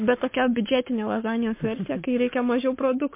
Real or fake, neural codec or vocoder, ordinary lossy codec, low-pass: fake; codec, 44.1 kHz, 7.8 kbps, Pupu-Codec; MP3, 24 kbps; 3.6 kHz